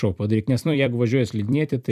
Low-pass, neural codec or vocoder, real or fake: 14.4 kHz; vocoder, 44.1 kHz, 128 mel bands every 256 samples, BigVGAN v2; fake